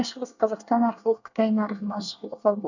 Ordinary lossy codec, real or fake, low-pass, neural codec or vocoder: none; fake; 7.2 kHz; codec, 24 kHz, 1 kbps, SNAC